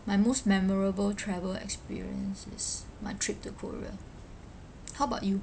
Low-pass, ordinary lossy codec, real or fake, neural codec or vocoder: none; none; real; none